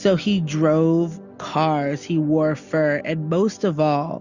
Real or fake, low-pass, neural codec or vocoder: real; 7.2 kHz; none